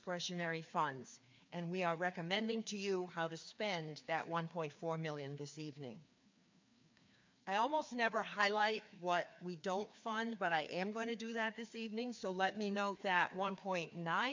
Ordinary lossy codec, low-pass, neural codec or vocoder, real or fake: MP3, 48 kbps; 7.2 kHz; codec, 16 kHz, 2 kbps, FreqCodec, larger model; fake